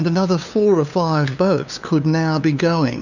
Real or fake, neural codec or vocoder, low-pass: fake; codec, 16 kHz, 2 kbps, FunCodec, trained on LibriTTS, 25 frames a second; 7.2 kHz